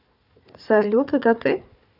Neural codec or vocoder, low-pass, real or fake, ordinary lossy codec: codec, 16 kHz, 4 kbps, FunCodec, trained on Chinese and English, 50 frames a second; 5.4 kHz; fake; none